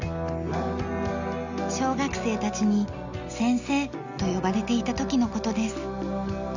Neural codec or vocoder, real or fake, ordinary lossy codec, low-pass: none; real; Opus, 64 kbps; 7.2 kHz